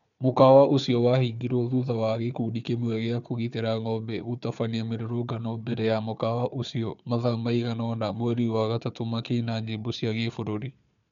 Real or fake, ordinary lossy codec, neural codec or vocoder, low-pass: fake; none; codec, 16 kHz, 4 kbps, FunCodec, trained on Chinese and English, 50 frames a second; 7.2 kHz